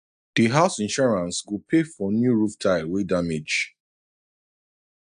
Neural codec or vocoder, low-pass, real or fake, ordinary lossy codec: none; 9.9 kHz; real; AAC, 96 kbps